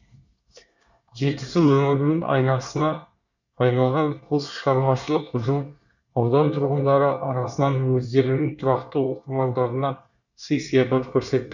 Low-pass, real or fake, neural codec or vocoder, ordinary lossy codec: 7.2 kHz; fake; codec, 24 kHz, 1 kbps, SNAC; none